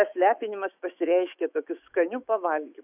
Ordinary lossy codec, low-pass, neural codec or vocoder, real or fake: AAC, 32 kbps; 3.6 kHz; autoencoder, 48 kHz, 128 numbers a frame, DAC-VAE, trained on Japanese speech; fake